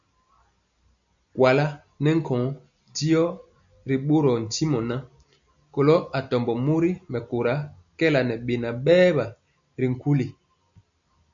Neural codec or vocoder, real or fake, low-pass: none; real; 7.2 kHz